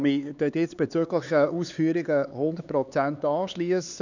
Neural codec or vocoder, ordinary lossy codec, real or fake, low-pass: codec, 16 kHz, 4 kbps, X-Codec, HuBERT features, trained on LibriSpeech; none; fake; 7.2 kHz